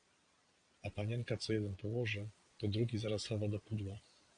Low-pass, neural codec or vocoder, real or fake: 9.9 kHz; vocoder, 22.05 kHz, 80 mel bands, Vocos; fake